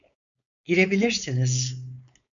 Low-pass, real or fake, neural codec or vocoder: 7.2 kHz; fake; codec, 16 kHz, 4.8 kbps, FACodec